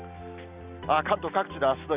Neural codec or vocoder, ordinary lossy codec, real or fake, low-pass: none; Opus, 64 kbps; real; 3.6 kHz